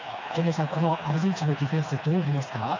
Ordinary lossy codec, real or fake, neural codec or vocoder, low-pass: AAC, 48 kbps; fake; codec, 16 kHz, 2 kbps, FreqCodec, smaller model; 7.2 kHz